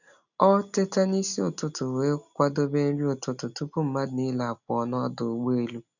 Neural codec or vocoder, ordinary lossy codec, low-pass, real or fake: none; none; 7.2 kHz; real